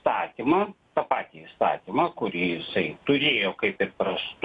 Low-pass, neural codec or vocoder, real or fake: 10.8 kHz; none; real